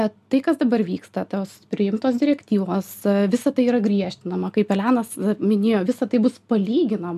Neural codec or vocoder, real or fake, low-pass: none; real; 14.4 kHz